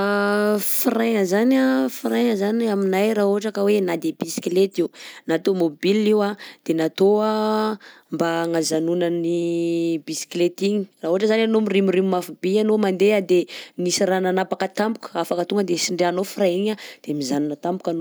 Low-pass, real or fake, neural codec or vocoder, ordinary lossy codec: none; real; none; none